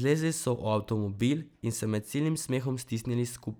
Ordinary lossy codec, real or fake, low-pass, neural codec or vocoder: none; real; none; none